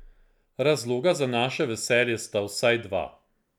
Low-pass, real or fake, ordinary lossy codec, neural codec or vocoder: 19.8 kHz; real; none; none